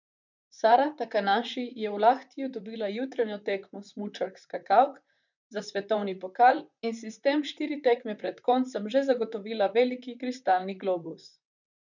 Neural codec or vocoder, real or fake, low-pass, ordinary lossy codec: vocoder, 44.1 kHz, 128 mel bands, Pupu-Vocoder; fake; 7.2 kHz; none